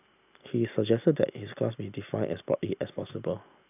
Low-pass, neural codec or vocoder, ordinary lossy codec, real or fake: 3.6 kHz; none; none; real